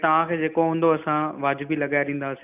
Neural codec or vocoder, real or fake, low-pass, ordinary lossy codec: none; real; 3.6 kHz; none